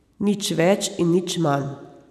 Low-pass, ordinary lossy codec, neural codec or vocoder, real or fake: 14.4 kHz; none; vocoder, 44.1 kHz, 128 mel bands every 256 samples, BigVGAN v2; fake